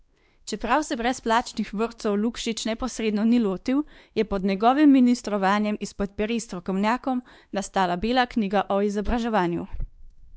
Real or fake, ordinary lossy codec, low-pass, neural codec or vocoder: fake; none; none; codec, 16 kHz, 2 kbps, X-Codec, WavLM features, trained on Multilingual LibriSpeech